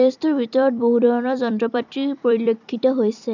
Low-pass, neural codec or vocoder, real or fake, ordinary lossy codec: 7.2 kHz; vocoder, 44.1 kHz, 128 mel bands every 256 samples, BigVGAN v2; fake; none